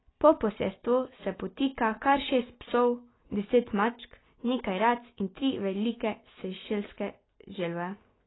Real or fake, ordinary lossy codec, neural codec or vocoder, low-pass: real; AAC, 16 kbps; none; 7.2 kHz